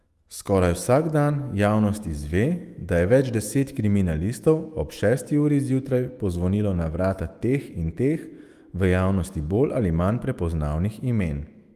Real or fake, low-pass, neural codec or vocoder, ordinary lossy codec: fake; 14.4 kHz; autoencoder, 48 kHz, 128 numbers a frame, DAC-VAE, trained on Japanese speech; Opus, 32 kbps